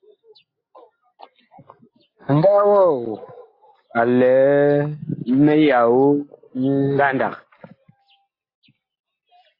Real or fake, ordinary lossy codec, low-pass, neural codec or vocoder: real; AAC, 24 kbps; 5.4 kHz; none